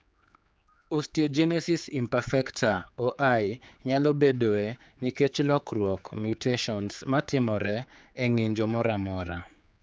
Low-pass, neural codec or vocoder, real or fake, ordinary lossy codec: none; codec, 16 kHz, 4 kbps, X-Codec, HuBERT features, trained on general audio; fake; none